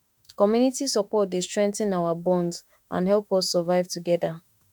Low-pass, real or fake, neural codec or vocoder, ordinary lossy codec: 19.8 kHz; fake; autoencoder, 48 kHz, 32 numbers a frame, DAC-VAE, trained on Japanese speech; none